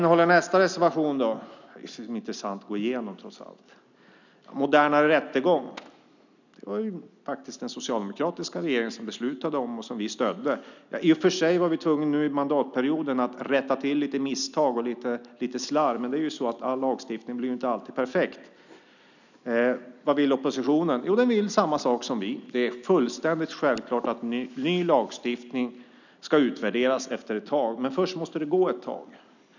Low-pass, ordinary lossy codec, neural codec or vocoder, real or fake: 7.2 kHz; none; none; real